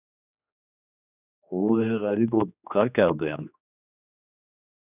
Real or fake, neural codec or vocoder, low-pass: fake; codec, 16 kHz, 2 kbps, X-Codec, HuBERT features, trained on general audio; 3.6 kHz